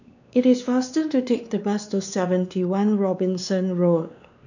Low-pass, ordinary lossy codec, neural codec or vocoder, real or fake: 7.2 kHz; none; codec, 16 kHz, 2 kbps, X-Codec, WavLM features, trained on Multilingual LibriSpeech; fake